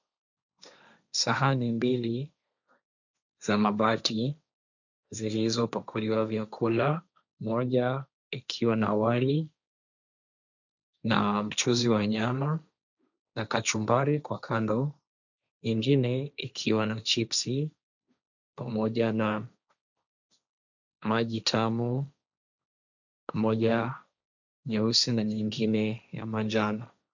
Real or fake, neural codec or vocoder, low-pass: fake; codec, 16 kHz, 1.1 kbps, Voila-Tokenizer; 7.2 kHz